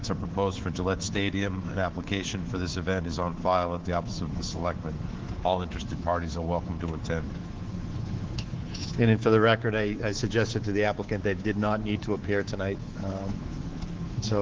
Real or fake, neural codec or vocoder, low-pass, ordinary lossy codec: fake; codec, 24 kHz, 3.1 kbps, DualCodec; 7.2 kHz; Opus, 16 kbps